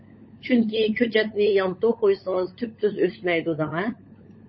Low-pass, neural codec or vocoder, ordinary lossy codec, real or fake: 7.2 kHz; codec, 16 kHz, 16 kbps, FunCodec, trained on LibriTTS, 50 frames a second; MP3, 24 kbps; fake